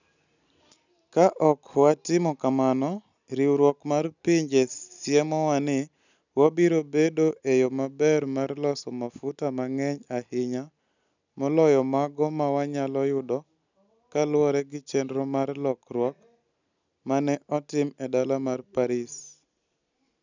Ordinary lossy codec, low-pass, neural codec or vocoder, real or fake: none; 7.2 kHz; none; real